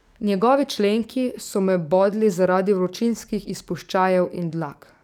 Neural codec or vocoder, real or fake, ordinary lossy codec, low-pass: codec, 44.1 kHz, 7.8 kbps, DAC; fake; none; 19.8 kHz